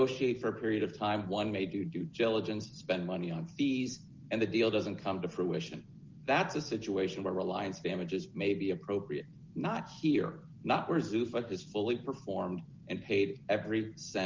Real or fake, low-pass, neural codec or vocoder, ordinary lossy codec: real; 7.2 kHz; none; Opus, 24 kbps